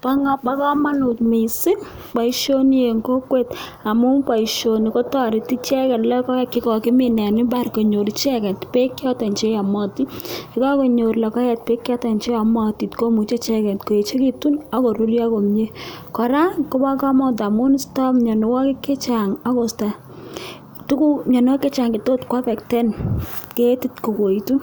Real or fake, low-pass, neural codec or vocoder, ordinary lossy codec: real; none; none; none